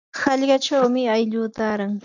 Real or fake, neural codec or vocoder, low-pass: real; none; 7.2 kHz